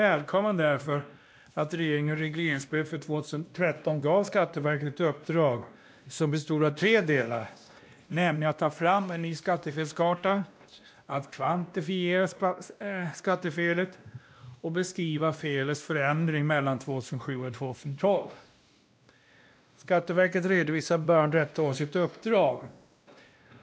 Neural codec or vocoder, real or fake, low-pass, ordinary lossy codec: codec, 16 kHz, 1 kbps, X-Codec, WavLM features, trained on Multilingual LibriSpeech; fake; none; none